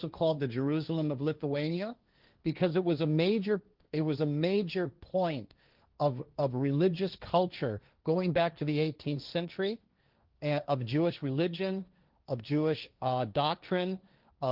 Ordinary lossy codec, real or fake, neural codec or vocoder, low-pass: Opus, 24 kbps; fake; codec, 16 kHz, 1.1 kbps, Voila-Tokenizer; 5.4 kHz